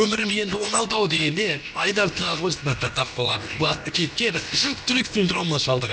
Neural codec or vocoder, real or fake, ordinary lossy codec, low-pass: codec, 16 kHz, about 1 kbps, DyCAST, with the encoder's durations; fake; none; none